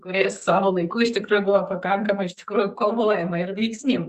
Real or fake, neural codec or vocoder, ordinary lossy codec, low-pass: fake; codec, 44.1 kHz, 2.6 kbps, SNAC; Opus, 64 kbps; 14.4 kHz